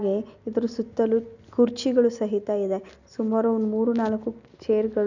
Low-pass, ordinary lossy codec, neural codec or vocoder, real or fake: 7.2 kHz; none; none; real